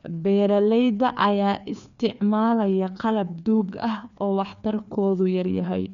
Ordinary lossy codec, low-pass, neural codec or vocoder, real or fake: none; 7.2 kHz; codec, 16 kHz, 2 kbps, FreqCodec, larger model; fake